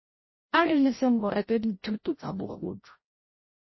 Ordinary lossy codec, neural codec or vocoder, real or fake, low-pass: MP3, 24 kbps; codec, 16 kHz, 0.5 kbps, FreqCodec, larger model; fake; 7.2 kHz